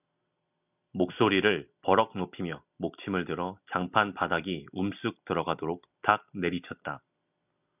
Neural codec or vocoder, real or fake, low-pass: none; real; 3.6 kHz